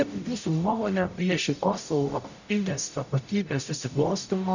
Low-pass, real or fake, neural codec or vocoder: 7.2 kHz; fake; codec, 44.1 kHz, 0.9 kbps, DAC